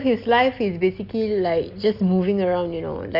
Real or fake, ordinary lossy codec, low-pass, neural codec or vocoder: real; Opus, 64 kbps; 5.4 kHz; none